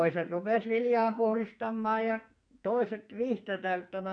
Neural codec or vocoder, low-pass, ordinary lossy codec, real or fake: codec, 44.1 kHz, 2.6 kbps, SNAC; 9.9 kHz; none; fake